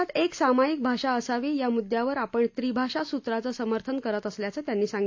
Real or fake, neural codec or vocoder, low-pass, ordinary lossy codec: real; none; 7.2 kHz; AAC, 48 kbps